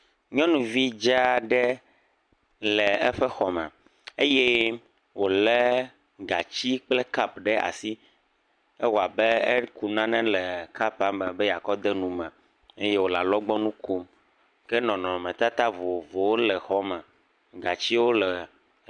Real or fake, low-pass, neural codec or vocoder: real; 9.9 kHz; none